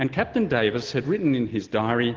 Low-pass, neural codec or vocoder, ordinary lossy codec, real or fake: 7.2 kHz; none; Opus, 16 kbps; real